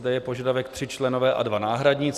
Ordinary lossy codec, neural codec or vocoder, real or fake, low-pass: Opus, 64 kbps; none; real; 14.4 kHz